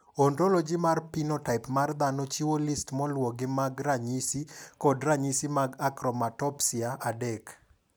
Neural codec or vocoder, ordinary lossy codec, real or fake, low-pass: none; none; real; none